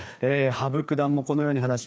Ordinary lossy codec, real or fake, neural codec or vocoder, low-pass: none; fake; codec, 16 kHz, 2 kbps, FreqCodec, larger model; none